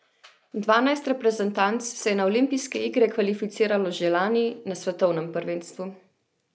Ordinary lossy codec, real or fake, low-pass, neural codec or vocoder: none; real; none; none